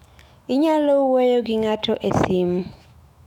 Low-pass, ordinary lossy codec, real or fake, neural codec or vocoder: 19.8 kHz; none; fake; autoencoder, 48 kHz, 128 numbers a frame, DAC-VAE, trained on Japanese speech